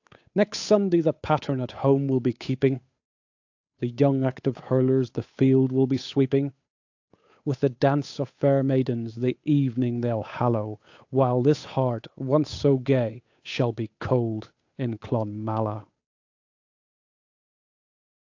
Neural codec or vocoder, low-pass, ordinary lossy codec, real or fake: codec, 16 kHz, 8 kbps, FunCodec, trained on Chinese and English, 25 frames a second; 7.2 kHz; AAC, 48 kbps; fake